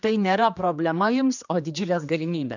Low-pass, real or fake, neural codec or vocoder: 7.2 kHz; fake; codec, 16 kHz, 2 kbps, X-Codec, HuBERT features, trained on general audio